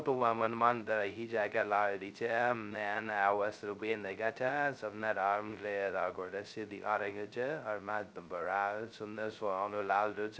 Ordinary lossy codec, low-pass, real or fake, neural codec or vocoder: none; none; fake; codec, 16 kHz, 0.2 kbps, FocalCodec